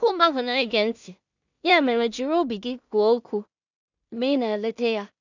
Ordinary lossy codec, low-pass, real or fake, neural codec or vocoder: none; 7.2 kHz; fake; codec, 16 kHz in and 24 kHz out, 0.4 kbps, LongCat-Audio-Codec, two codebook decoder